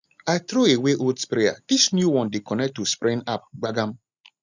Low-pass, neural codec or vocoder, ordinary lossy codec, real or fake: 7.2 kHz; none; none; real